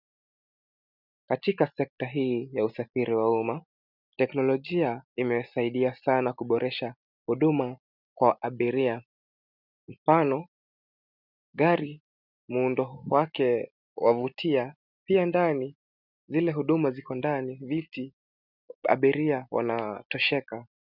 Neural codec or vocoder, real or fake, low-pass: none; real; 5.4 kHz